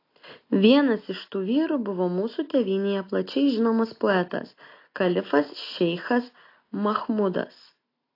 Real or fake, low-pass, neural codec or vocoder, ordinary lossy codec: real; 5.4 kHz; none; AAC, 32 kbps